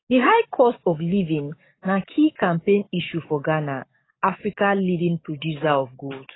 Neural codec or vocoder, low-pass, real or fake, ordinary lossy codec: codec, 44.1 kHz, 7.8 kbps, DAC; 7.2 kHz; fake; AAC, 16 kbps